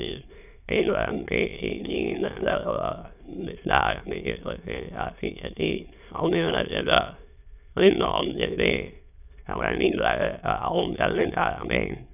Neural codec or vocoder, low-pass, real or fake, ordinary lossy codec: autoencoder, 22.05 kHz, a latent of 192 numbers a frame, VITS, trained on many speakers; 3.6 kHz; fake; none